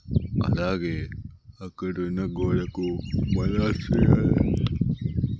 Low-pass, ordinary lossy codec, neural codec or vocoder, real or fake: none; none; none; real